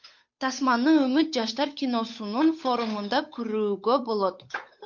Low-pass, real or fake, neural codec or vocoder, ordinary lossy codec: 7.2 kHz; fake; codec, 16 kHz, 8 kbps, FunCodec, trained on Chinese and English, 25 frames a second; MP3, 48 kbps